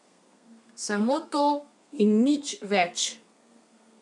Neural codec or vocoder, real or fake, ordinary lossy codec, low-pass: codec, 32 kHz, 1.9 kbps, SNAC; fake; none; 10.8 kHz